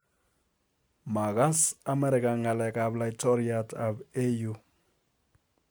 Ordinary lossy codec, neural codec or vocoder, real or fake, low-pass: none; none; real; none